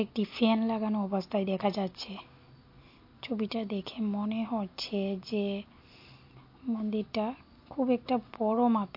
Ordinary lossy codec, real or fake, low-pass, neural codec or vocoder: MP3, 32 kbps; real; 5.4 kHz; none